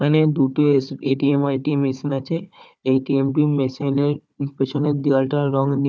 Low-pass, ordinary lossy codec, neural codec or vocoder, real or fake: none; none; codec, 16 kHz, 4 kbps, FunCodec, trained on Chinese and English, 50 frames a second; fake